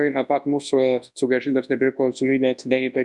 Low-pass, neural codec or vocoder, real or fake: 10.8 kHz; codec, 24 kHz, 0.9 kbps, WavTokenizer, large speech release; fake